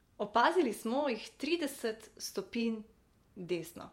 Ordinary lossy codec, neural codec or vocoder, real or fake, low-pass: MP3, 64 kbps; vocoder, 48 kHz, 128 mel bands, Vocos; fake; 19.8 kHz